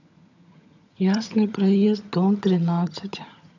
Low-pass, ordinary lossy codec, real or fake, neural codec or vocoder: 7.2 kHz; none; fake; vocoder, 22.05 kHz, 80 mel bands, HiFi-GAN